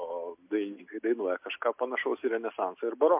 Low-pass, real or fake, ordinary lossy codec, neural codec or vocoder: 3.6 kHz; real; MP3, 32 kbps; none